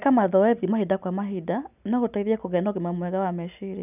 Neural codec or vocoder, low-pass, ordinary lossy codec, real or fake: none; 3.6 kHz; none; real